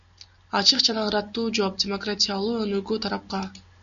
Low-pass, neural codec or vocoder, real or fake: 7.2 kHz; none; real